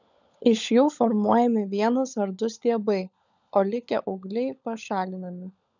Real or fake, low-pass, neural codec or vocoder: fake; 7.2 kHz; codec, 16 kHz, 16 kbps, FunCodec, trained on LibriTTS, 50 frames a second